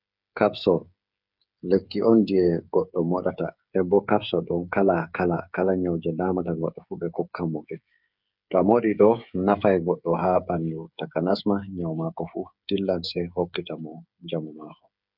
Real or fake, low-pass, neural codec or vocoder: fake; 5.4 kHz; codec, 16 kHz, 8 kbps, FreqCodec, smaller model